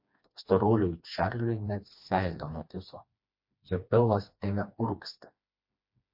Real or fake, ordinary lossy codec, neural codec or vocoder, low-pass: fake; MP3, 32 kbps; codec, 16 kHz, 2 kbps, FreqCodec, smaller model; 5.4 kHz